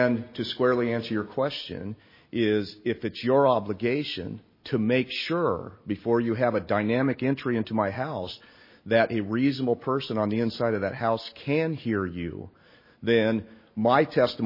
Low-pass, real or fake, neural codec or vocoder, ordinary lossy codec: 5.4 kHz; real; none; MP3, 24 kbps